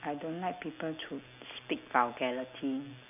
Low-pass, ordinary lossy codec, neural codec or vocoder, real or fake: 3.6 kHz; none; none; real